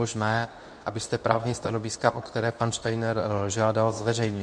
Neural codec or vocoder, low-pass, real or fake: codec, 24 kHz, 0.9 kbps, WavTokenizer, medium speech release version 2; 9.9 kHz; fake